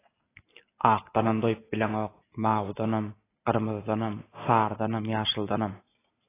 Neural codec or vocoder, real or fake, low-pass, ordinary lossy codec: none; real; 3.6 kHz; AAC, 16 kbps